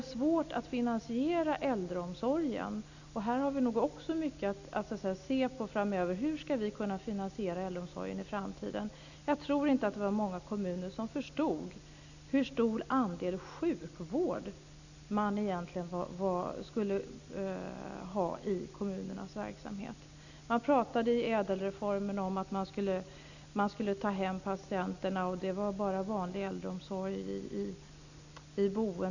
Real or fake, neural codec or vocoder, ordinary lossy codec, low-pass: real; none; none; 7.2 kHz